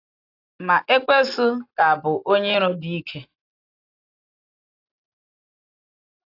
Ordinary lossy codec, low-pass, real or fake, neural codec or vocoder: AAC, 32 kbps; 5.4 kHz; real; none